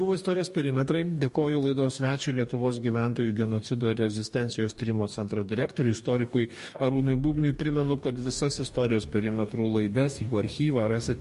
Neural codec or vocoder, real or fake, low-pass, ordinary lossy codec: codec, 44.1 kHz, 2.6 kbps, DAC; fake; 14.4 kHz; MP3, 48 kbps